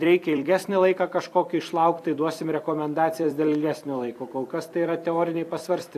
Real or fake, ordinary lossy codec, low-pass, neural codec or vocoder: fake; AAC, 64 kbps; 14.4 kHz; vocoder, 44.1 kHz, 128 mel bands every 256 samples, BigVGAN v2